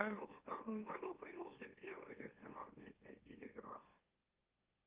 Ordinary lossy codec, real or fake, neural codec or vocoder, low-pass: Opus, 24 kbps; fake; autoencoder, 44.1 kHz, a latent of 192 numbers a frame, MeloTTS; 3.6 kHz